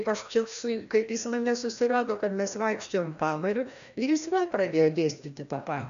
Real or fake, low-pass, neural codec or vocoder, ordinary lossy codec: fake; 7.2 kHz; codec, 16 kHz, 1 kbps, FreqCodec, larger model; AAC, 96 kbps